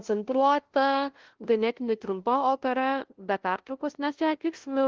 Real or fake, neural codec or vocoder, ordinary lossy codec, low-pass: fake; codec, 16 kHz, 0.5 kbps, FunCodec, trained on LibriTTS, 25 frames a second; Opus, 16 kbps; 7.2 kHz